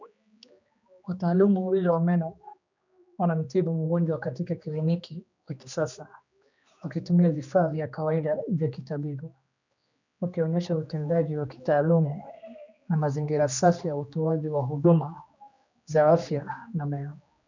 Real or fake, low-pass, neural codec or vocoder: fake; 7.2 kHz; codec, 16 kHz, 2 kbps, X-Codec, HuBERT features, trained on general audio